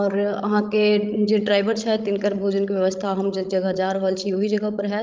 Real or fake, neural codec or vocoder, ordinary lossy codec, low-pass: fake; codec, 16 kHz, 16 kbps, FreqCodec, larger model; Opus, 24 kbps; 7.2 kHz